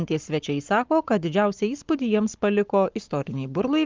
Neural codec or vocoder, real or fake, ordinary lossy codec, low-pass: codec, 44.1 kHz, 7.8 kbps, Pupu-Codec; fake; Opus, 32 kbps; 7.2 kHz